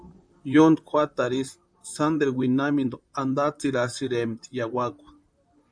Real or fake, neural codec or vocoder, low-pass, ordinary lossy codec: fake; vocoder, 44.1 kHz, 128 mel bands, Pupu-Vocoder; 9.9 kHz; MP3, 96 kbps